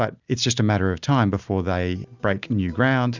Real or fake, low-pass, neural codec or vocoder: real; 7.2 kHz; none